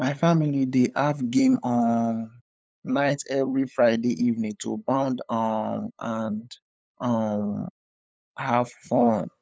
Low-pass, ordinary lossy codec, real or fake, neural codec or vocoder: none; none; fake; codec, 16 kHz, 8 kbps, FunCodec, trained on LibriTTS, 25 frames a second